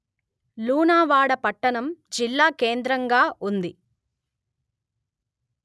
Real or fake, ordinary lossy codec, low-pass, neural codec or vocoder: real; none; none; none